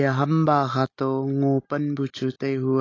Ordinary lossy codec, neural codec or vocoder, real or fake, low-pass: AAC, 32 kbps; none; real; 7.2 kHz